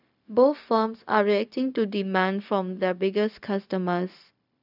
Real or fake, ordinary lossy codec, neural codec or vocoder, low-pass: fake; none; codec, 16 kHz, 0.4 kbps, LongCat-Audio-Codec; 5.4 kHz